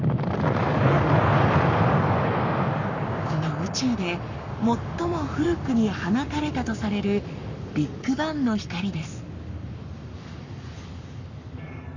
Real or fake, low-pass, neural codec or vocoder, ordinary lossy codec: fake; 7.2 kHz; codec, 44.1 kHz, 7.8 kbps, Pupu-Codec; none